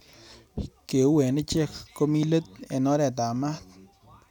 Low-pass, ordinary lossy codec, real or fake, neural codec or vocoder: 19.8 kHz; none; real; none